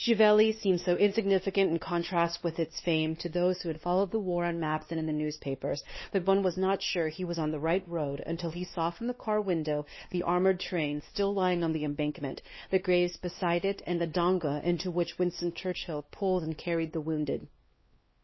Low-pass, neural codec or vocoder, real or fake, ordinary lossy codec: 7.2 kHz; codec, 16 kHz, 2 kbps, X-Codec, WavLM features, trained on Multilingual LibriSpeech; fake; MP3, 24 kbps